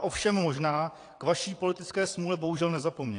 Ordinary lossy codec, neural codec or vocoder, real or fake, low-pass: AAC, 48 kbps; vocoder, 22.05 kHz, 80 mel bands, WaveNeXt; fake; 9.9 kHz